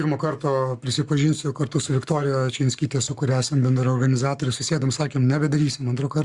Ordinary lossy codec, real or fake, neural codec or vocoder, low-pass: Opus, 64 kbps; fake; codec, 44.1 kHz, 7.8 kbps, Pupu-Codec; 10.8 kHz